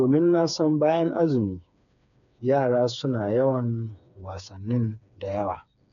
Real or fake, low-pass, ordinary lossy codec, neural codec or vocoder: fake; 7.2 kHz; none; codec, 16 kHz, 4 kbps, FreqCodec, smaller model